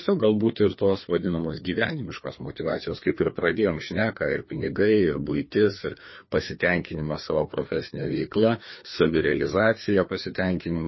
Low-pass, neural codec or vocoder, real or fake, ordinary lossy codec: 7.2 kHz; codec, 16 kHz, 2 kbps, FreqCodec, larger model; fake; MP3, 24 kbps